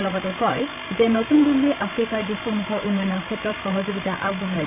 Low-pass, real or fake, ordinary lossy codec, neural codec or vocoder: 3.6 kHz; fake; none; codec, 16 kHz, 16 kbps, FreqCodec, larger model